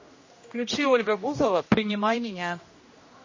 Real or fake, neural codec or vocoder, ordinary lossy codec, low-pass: fake; codec, 16 kHz, 1 kbps, X-Codec, HuBERT features, trained on general audio; MP3, 32 kbps; 7.2 kHz